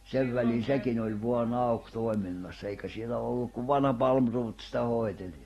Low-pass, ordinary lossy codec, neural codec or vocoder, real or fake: 19.8 kHz; AAC, 32 kbps; none; real